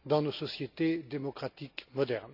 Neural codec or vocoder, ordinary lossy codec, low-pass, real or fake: none; none; 5.4 kHz; real